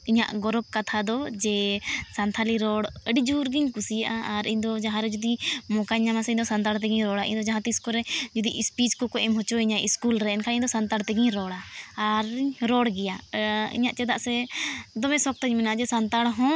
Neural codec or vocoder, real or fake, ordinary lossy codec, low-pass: none; real; none; none